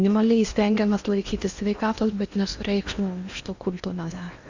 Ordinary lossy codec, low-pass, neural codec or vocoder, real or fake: Opus, 64 kbps; 7.2 kHz; codec, 16 kHz in and 24 kHz out, 0.8 kbps, FocalCodec, streaming, 65536 codes; fake